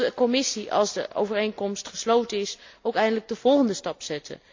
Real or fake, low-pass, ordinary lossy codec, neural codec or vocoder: real; 7.2 kHz; MP3, 48 kbps; none